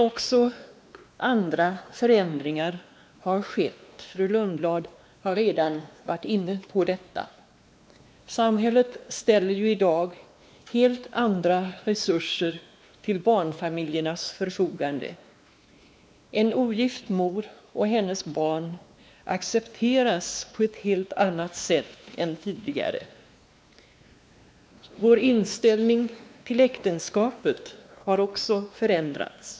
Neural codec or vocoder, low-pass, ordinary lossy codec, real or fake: codec, 16 kHz, 2 kbps, X-Codec, WavLM features, trained on Multilingual LibriSpeech; none; none; fake